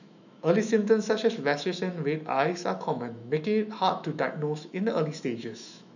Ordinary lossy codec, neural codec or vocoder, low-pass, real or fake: none; autoencoder, 48 kHz, 128 numbers a frame, DAC-VAE, trained on Japanese speech; 7.2 kHz; fake